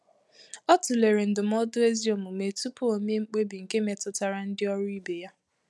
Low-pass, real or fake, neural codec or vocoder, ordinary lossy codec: none; real; none; none